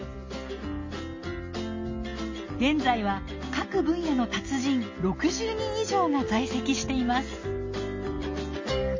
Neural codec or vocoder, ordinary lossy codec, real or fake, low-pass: none; MP3, 32 kbps; real; 7.2 kHz